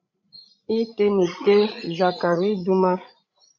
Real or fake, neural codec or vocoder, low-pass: fake; codec, 16 kHz, 16 kbps, FreqCodec, larger model; 7.2 kHz